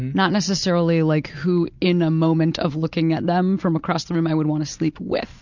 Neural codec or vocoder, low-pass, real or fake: none; 7.2 kHz; real